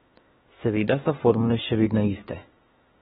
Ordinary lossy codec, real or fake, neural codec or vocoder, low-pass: AAC, 16 kbps; fake; autoencoder, 48 kHz, 32 numbers a frame, DAC-VAE, trained on Japanese speech; 19.8 kHz